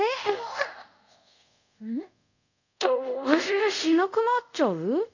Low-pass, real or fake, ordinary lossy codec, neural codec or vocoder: 7.2 kHz; fake; none; codec, 24 kHz, 0.5 kbps, DualCodec